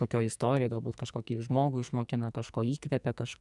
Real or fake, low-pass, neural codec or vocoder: fake; 10.8 kHz; codec, 44.1 kHz, 2.6 kbps, SNAC